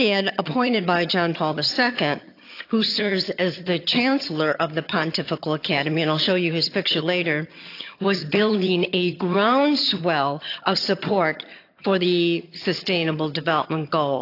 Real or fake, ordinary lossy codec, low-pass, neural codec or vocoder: fake; AAC, 32 kbps; 5.4 kHz; vocoder, 22.05 kHz, 80 mel bands, HiFi-GAN